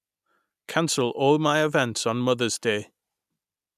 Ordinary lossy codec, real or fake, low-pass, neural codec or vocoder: none; real; 14.4 kHz; none